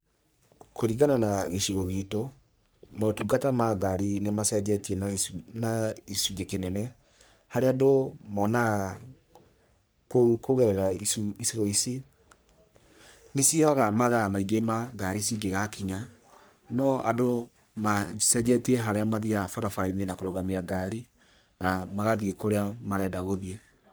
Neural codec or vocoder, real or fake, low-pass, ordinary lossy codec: codec, 44.1 kHz, 3.4 kbps, Pupu-Codec; fake; none; none